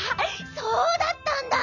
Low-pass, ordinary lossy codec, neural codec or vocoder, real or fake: 7.2 kHz; none; none; real